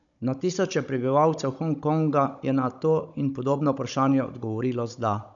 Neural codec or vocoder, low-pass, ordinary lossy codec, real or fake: codec, 16 kHz, 16 kbps, FunCodec, trained on Chinese and English, 50 frames a second; 7.2 kHz; none; fake